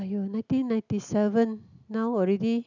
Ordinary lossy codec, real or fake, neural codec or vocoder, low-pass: none; real; none; 7.2 kHz